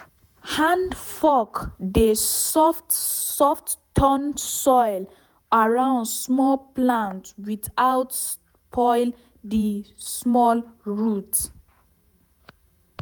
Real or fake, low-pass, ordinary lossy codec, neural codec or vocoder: fake; none; none; vocoder, 48 kHz, 128 mel bands, Vocos